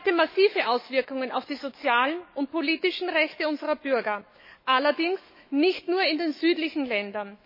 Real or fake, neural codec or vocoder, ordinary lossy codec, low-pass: fake; autoencoder, 48 kHz, 128 numbers a frame, DAC-VAE, trained on Japanese speech; MP3, 24 kbps; 5.4 kHz